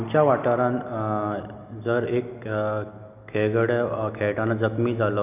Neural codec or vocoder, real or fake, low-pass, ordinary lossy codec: none; real; 3.6 kHz; none